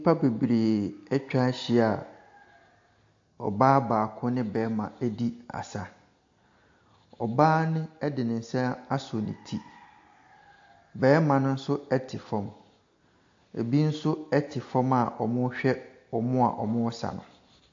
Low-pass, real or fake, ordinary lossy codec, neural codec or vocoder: 7.2 kHz; real; AAC, 48 kbps; none